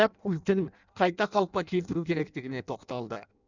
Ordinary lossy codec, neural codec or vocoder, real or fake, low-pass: none; codec, 16 kHz in and 24 kHz out, 0.6 kbps, FireRedTTS-2 codec; fake; 7.2 kHz